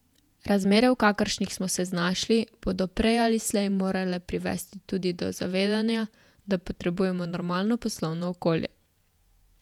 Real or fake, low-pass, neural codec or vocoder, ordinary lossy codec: fake; 19.8 kHz; vocoder, 48 kHz, 128 mel bands, Vocos; none